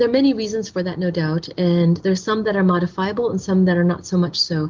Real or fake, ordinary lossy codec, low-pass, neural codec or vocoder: real; Opus, 32 kbps; 7.2 kHz; none